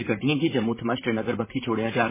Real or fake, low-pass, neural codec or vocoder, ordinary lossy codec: fake; 3.6 kHz; vocoder, 44.1 kHz, 128 mel bands, Pupu-Vocoder; MP3, 16 kbps